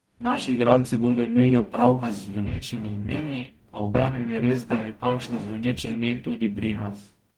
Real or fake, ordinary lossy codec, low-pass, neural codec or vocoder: fake; Opus, 24 kbps; 19.8 kHz; codec, 44.1 kHz, 0.9 kbps, DAC